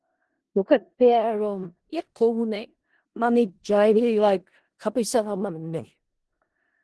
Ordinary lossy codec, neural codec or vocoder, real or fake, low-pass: Opus, 16 kbps; codec, 16 kHz in and 24 kHz out, 0.4 kbps, LongCat-Audio-Codec, four codebook decoder; fake; 10.8 kHz